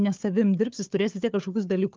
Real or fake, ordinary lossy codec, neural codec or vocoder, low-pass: fake; Opus, 32 kbps; codec, 16 kHz, 4 kbps, FunCodec, trained on Chinese and English, 50 frames a second; 7.2 kHz